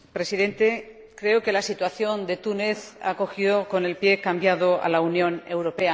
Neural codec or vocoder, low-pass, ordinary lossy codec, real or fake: none; none; none; real